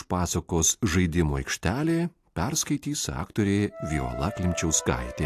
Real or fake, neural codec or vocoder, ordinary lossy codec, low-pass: real; none; AAC, 64 kbps; 14.4 kHz